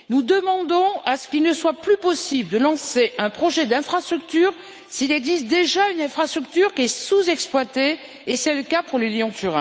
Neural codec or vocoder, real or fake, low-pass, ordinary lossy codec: codec, 16 kHz, 8 kbps, FunCodec, trained on Chinese and English, 25 frames a second; fake; none; none